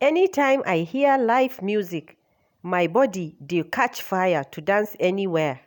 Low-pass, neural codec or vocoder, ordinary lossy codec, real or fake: 19.8 kHz; none; none; real